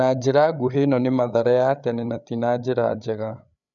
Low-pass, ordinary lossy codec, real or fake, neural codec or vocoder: 7.2 kHz; none; fake; codec, 16 kHz, 8 kbps, FreqCodec, larger model